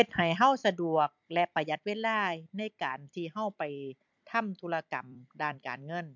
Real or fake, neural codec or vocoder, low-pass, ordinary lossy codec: fake; vocoder, 44.1 kHz, 128 mel bands every 256 samples, BigVGAN v2; 7.2 kHz; MP3, 64 kbps